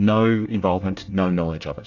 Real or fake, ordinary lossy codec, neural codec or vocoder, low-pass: fake; AAC, 48 kbps; codec, 24 kHz, 1 kbps, SNAC; 7.2 kHz